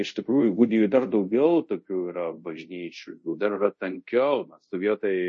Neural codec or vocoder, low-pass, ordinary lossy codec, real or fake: codec, 24 kHz, 0.5 kbps, DualCodec; 10.8 kHz; MP3, 32 kbps; fake